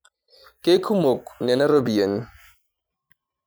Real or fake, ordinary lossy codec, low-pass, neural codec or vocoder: real; none; none; none